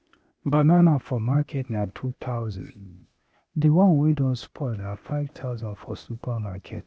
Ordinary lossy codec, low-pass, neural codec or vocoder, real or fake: none; none; codec, 16 kHz, 0.8 kbps, ZipCodec; fake